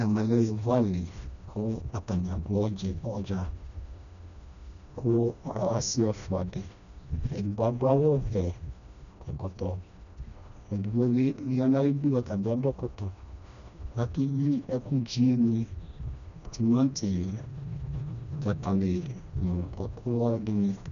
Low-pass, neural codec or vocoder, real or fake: 7.2 kHz; codec, 16 kHz, 1 kbps, FreqCodec, smaller model; fake